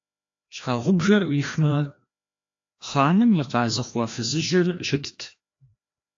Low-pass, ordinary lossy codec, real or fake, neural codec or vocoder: 7.2 kHz; AAC, 48 kbps; fake; codec, 16 kHz, 1 kbps, FreqCodec, larger model